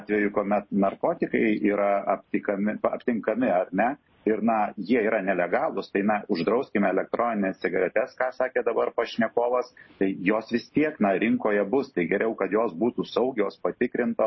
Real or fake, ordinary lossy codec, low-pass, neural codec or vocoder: real; MP3, 24 kbps; 7.2 kHz; none